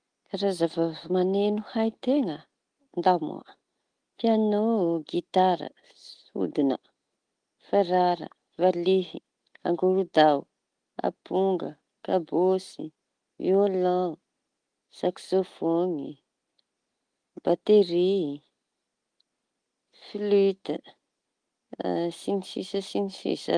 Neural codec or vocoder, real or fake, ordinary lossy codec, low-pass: none; real; Opus, 24 kbps; 9.9 kHz